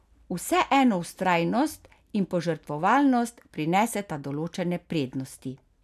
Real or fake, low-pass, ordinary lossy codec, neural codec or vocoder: real; 14.4 kHz; none; none